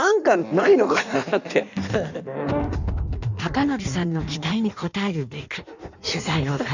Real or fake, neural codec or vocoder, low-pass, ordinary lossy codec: fake; codec, 16 kHz in and 24 kHz out, 1.1 kbps, FireRedTTS-2 codec; 7.2 kHz; none